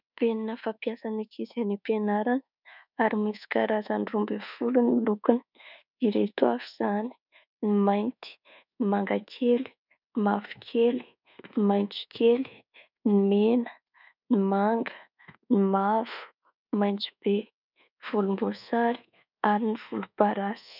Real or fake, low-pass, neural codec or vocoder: fake; 5.4 kHz; codec, 24 kHz, 1.2 kbps, DualCodec